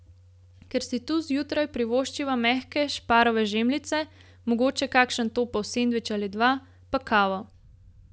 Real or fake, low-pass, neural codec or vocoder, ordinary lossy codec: real; none; none; none